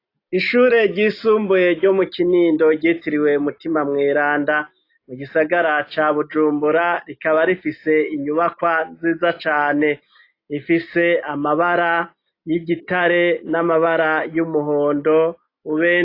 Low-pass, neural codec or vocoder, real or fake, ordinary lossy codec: 5.4 kHz; none; real; AAC, 32 kbps